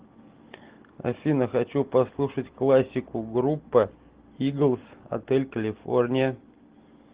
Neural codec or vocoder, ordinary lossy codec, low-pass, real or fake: none; Opus, 16 kbps; 3.6 kHz; real